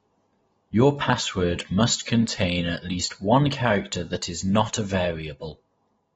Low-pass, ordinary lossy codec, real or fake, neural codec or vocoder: 19.8 kHz; AAC, 24 kbps; real; none